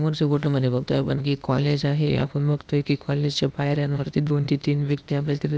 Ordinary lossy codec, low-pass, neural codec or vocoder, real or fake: none; none; codec, 16 kHz, 0.8 kbps, ZipCodec; fake